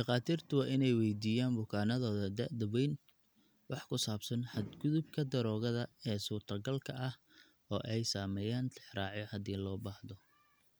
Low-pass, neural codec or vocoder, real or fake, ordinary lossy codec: none; none; real; none